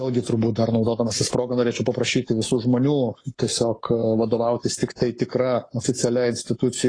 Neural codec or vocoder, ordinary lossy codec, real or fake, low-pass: codec, 44.1 kHz, 7.8 kbps, Pupu-Codec; AAC, 32 kbps; fake; 9.9 kHz